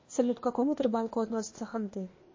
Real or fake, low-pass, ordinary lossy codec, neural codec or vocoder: fake; 7.2 kHz; MP3, 32 kbps; codec, 16 kHz, 0.8 kbps, ZipCodec